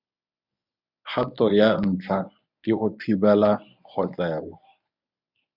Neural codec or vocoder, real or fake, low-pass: codec, 24 kHz, 0.9 kbps, WavTokenizer, medium speech release version 1; fake; 5.4 kHz